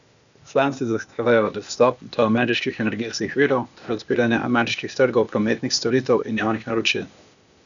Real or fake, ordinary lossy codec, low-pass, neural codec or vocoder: fake; none; 7.2 kHz; codec, 16 kHz, 0.8 kbps, ZipCodec